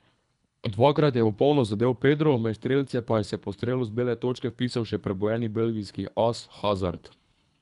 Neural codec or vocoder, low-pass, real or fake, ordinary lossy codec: codec, 24 kHz, 3 kbps, HILCodec; 10.8 kHz; fake; none